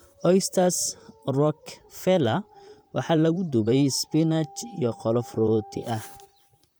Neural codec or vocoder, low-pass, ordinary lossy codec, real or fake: vocoder, 44.1 kHz, 128 mel bands, Pupu-Vocoder; none; none; fake